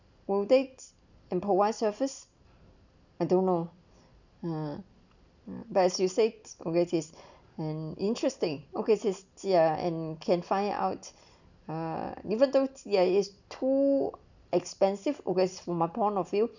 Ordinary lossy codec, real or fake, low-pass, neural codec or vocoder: none; real; 7.2 kHz; none